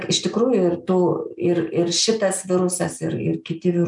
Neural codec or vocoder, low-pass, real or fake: none; 10.8 kHz; real